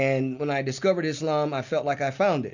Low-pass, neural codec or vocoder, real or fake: 7.2 kHz; none; real